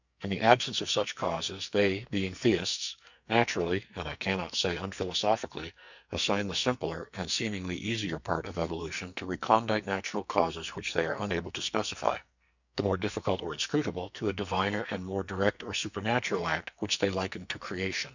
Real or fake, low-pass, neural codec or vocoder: fake; 7.2 kHz; codec, 44.1 kHz, 2.6 kbps, SNAC